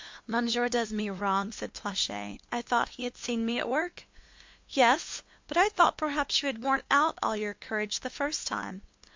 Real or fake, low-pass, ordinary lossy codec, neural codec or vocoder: fake; 7.2 kHz; MP3, 48 kbps; codec, 16 kHz, 2 kbps, FunCodec, trained on LibriTTS, 25 frames a second